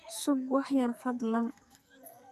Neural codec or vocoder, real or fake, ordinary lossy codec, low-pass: codec, 44.1 kHz, 2.6 kbps, SNAC; fake; none; 14.4 kHz